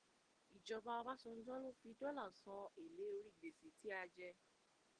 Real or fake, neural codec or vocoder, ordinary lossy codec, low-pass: real; none; Opus, 16 kbps; 9.9 kHz